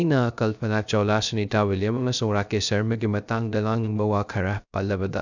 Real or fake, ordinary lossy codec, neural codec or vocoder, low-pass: fake; none; codec, 16 kHz, 0.3 kbps, FocalCodec; 7.2 kHz